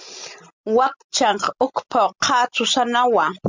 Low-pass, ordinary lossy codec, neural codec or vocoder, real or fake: 7.2 kHz; MP3, 64 kbps; none; real